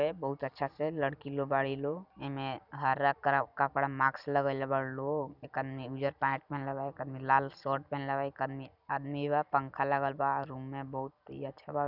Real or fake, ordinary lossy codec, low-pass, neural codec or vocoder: real; none; 5.4 kHz; none